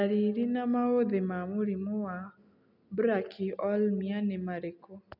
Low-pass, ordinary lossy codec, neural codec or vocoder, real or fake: 5.4 kHz; none; none; real